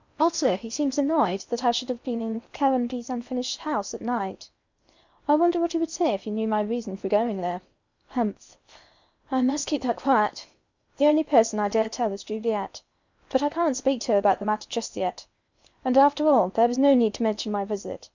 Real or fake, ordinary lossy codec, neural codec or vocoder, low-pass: fake; Opus, 64 kbps; codec, 16 kHz in and 24 kHz out, 0.8 kbps, FocalCodec, streaming, 65536 codes; 7.2 kHz